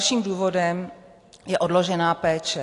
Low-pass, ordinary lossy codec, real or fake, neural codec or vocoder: 10.8 kHz; AAC, 48 kbps; real; none